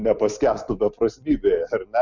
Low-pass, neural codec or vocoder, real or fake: 7.2 kHz; none; real